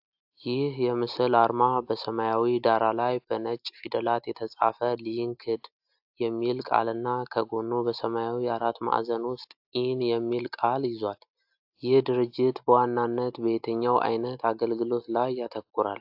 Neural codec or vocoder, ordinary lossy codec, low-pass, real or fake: none; AAC, 48 kbps; 5.4 kHz; real